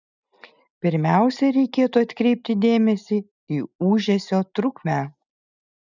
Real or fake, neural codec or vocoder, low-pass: real; none; 7.2 kHz